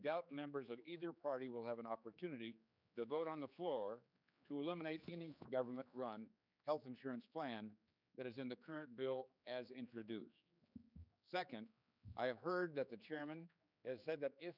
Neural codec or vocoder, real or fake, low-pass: codec, 16 kHz, 4 kbps, X-Codec, HuBERT features, trained on general audio; fake; 5.4 kHz